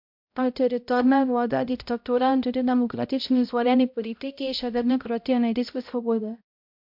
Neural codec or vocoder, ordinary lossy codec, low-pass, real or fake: codec, 16 kHz, 0.5 kbps, X-Codec, HuBERT features, trained on balanced general audio; MP3, 48 kbps; 5.4 kHz; fake